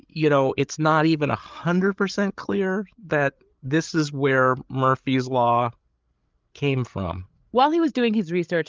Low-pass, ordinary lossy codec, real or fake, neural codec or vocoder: 7.2 kHz; Opus, 32 kbps; fake; codec, 16 kHz, 8 kbps, FreqCodec, larger model